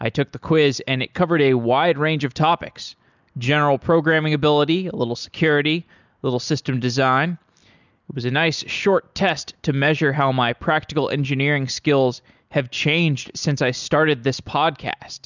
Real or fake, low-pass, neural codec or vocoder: real; 7.2 kHz; none